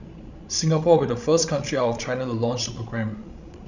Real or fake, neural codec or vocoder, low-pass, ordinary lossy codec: fake; codec, 16 kHz, 16 kbps, FreqCodec, larger model; 7.2 kHz; none